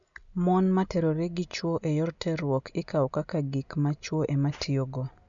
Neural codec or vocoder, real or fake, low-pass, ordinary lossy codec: none; real; 7.2 kHz; none